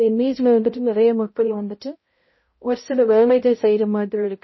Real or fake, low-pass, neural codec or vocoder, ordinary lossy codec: fake; 7.2 kHz; codec, 16 kHz, 0.5 kbps, X-Codec, HuBERT features, trained on balanced general audio; MP3, 24 kbps